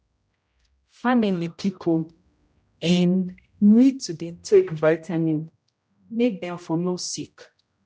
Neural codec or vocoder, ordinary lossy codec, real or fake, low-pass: codec, 16 kHz, 0.5 kbps, X-Codec, HuBERT features, trained on general audio; none; fake; none